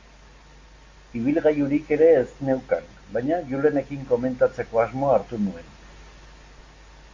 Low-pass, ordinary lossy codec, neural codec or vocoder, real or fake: 7.2 kHz; MP3, 48 kbps; none; real